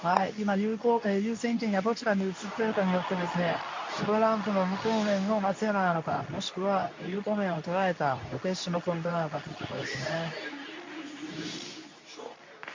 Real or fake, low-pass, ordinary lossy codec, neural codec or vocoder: fake; 7.2 kHz; MP3, 48 kbps; codec, 24 kHz, 0.9 kbps, WavTokenizer, medium speech release version 2